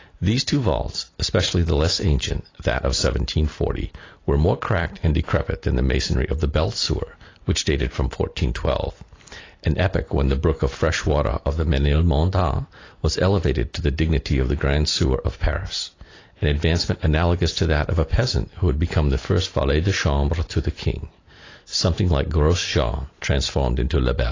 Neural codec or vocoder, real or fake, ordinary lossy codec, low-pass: none; real; AAC, 32 kbps; 7.2 kHz